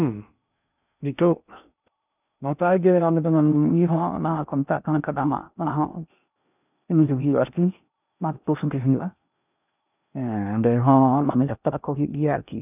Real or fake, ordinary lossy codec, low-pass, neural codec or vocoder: fake; none; 3.6 kHz; codec, 16 kHz in and 24 kHz out, 0.6 kbps, FocalCodec, streaming, 2048 codes